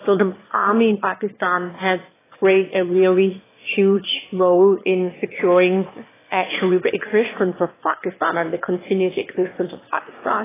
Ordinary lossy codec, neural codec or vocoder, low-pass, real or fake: AAC, 16 kbps; autoencoder, 22.05 kHz, a latent of 192 numbers a frame, VITS, trained on one speaker; 3.6 kHz; fake